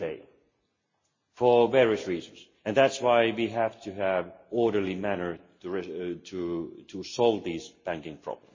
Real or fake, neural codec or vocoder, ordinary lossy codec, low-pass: real; none; MP3, 32 kbps; 7.2 kHz